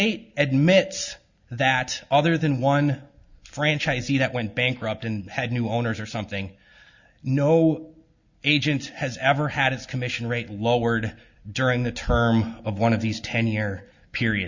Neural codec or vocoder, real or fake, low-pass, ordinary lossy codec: none; real; 7.2 kHz; Opus, 64 kbps